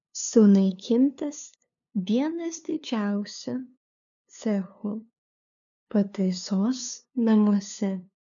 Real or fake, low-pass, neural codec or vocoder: fake; 7.2 kHz; codec, 16 kHz, 2 kbps, FunCodec, trained on LibriTTS, 25 frames a second